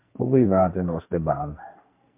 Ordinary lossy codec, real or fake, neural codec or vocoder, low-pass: MP3, 32 kbps; fake; codec, 44.1 kHz, 2.6 kbps, SNAC; 3.6 kHz